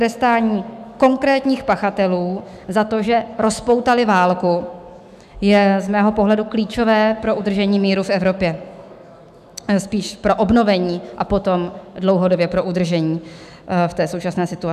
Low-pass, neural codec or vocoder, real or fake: 14.4 kHz; autoencoder, 48 kHz, 128 numbers a frame, DAC-VAE, trained on Japanese speech; fake